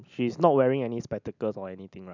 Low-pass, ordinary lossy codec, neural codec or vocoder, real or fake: 7.2 kHz; none; none; real